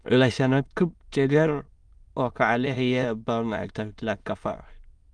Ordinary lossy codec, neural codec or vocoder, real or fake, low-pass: Opus, 32 kbps; autoencoder, 22.05 kHz, a latent of 192 numbers a frame, VITS, trained on many speakers; fake; 9.9 kHz